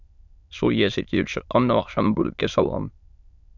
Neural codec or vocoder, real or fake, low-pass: autoencoder, 22.05 kHz, a latent of 192 numbers a frame, VITS, trained on many speakers; fake; 7.2 kHz